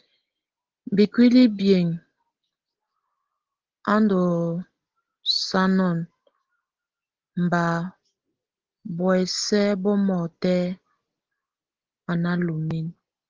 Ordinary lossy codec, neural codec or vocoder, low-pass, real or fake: Opus, 16 kbps; none; 7.2 kHz; real